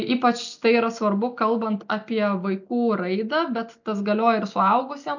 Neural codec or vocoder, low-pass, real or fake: none; 7.2 kHz; real